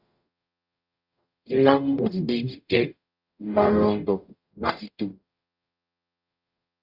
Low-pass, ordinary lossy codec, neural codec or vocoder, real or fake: 5.4 kHz; Opus, 64 kbps; codec, 44.1 kHz, 0.9 kbps, DAC; fake